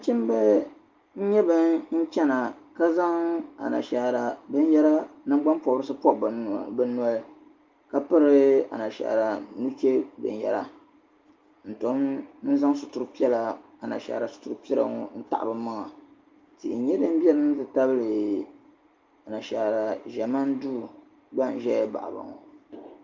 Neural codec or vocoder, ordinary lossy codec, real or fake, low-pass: none; Opus, 32 kbps; real; 7.2 kHz